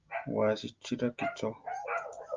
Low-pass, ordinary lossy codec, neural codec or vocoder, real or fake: 7.2 kHz; Opus, 24 kbps; none; real